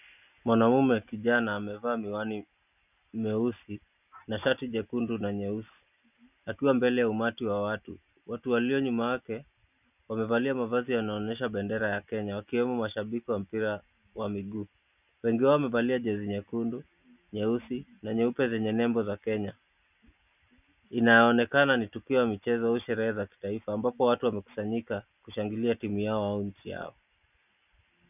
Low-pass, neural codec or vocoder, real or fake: 3.6 kHz; none; real